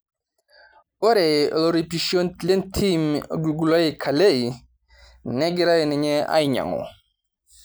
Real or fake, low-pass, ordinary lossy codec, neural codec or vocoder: real; none; none; none